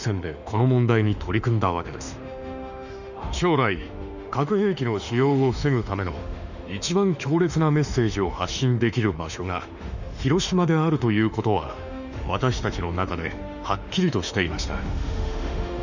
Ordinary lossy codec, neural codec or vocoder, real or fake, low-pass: none; autoencoder, 48 kHz, 32 numbers a frame, DAC-VAE, trained on Japanese speech; fake; 7.2 kHz